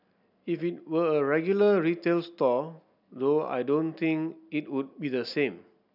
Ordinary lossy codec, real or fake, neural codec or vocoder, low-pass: none; real; none; 5.4 kHz